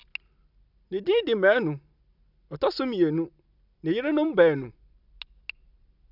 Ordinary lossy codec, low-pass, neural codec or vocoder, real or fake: none; 5.4 kHz; none; real